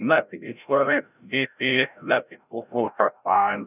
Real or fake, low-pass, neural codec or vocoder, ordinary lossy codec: fake; 3.6 kHz; codec, 16 kHz, 0.5 kbps, FreqCodec, larger model; none